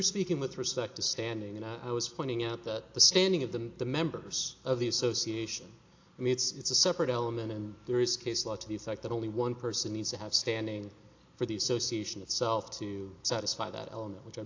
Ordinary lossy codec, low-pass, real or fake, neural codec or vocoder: AAC, 48 kbps; 7.2 kHz; real; none